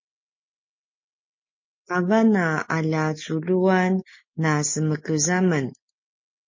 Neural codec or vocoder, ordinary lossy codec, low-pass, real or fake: none; MP3, 32 kbps; 7.2 kHz; real